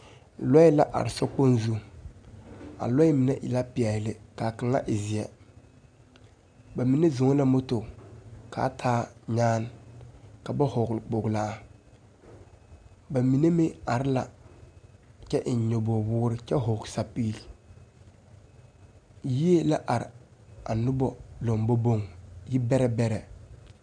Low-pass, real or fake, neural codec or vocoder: 9.9 kHz; real; none